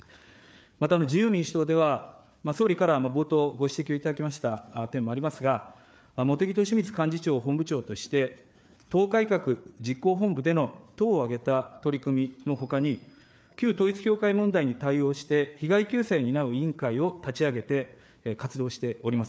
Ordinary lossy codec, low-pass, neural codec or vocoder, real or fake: none; none; codec, 16 kHz, 4 kbps, FreqCodec, larger model; fake